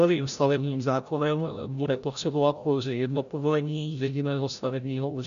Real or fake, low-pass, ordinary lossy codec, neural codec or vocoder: fake; 7.2 kHz; MP3, 96 kbps; codec, 16 kHz, 0.5 kbps, FreqCodec, larger model